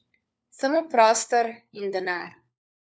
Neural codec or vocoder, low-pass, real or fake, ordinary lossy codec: codec, 16 kHz, 4 kbps, FunCodec, trained on LibriTTS, 50 frames a second; none; fake; none